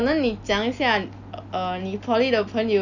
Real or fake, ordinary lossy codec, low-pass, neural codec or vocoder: real; none; 7.2 kHz; none